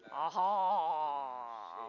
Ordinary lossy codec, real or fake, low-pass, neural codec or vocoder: none; real; 7.2 kHz; none